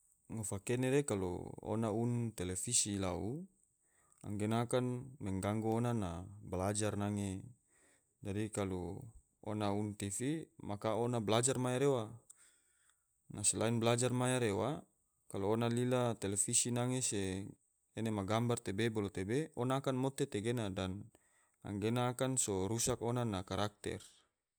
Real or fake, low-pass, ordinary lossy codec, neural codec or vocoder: real; none; none; none